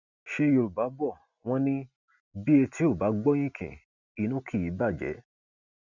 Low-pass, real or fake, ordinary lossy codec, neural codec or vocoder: 7.2 kHz; real; none; none